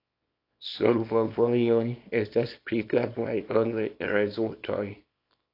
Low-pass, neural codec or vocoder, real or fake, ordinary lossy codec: 5.4 kHz; codec, 24 kHz, 0.9 kbps, WavTokenizer, small release; fake; AAC, 32 kbps